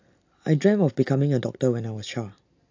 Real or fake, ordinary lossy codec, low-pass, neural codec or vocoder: real; AAC, 48 kbps; 7.2 kHz; none